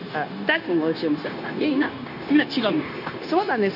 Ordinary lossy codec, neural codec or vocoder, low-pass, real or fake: none; codec, 16 kHz, 0.9 kbps, LongCat-Audio-Codec; 5.4 kHz; fake